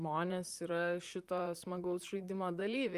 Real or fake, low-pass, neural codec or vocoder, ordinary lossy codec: fake; 14.4 kHz; vocoder, 44.1 kHz, 128 mel bands, Pupu-Vocoder; Opus, 32 kbps